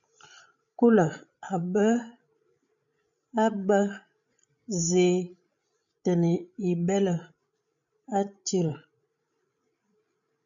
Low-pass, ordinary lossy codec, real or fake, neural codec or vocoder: 7.2 kHz; MP3, 96 kbps; fake; codec, 16 kHz, 16 kbps, FreqCodec, larger model